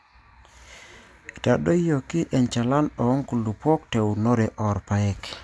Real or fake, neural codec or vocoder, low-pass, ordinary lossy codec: real; none; none; none